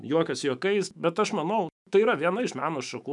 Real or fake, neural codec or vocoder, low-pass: fake; autoencoder, 48 kHz, 128 numbers a frame, DAC-VAE, trained on Japanese speech; 10.8 kHz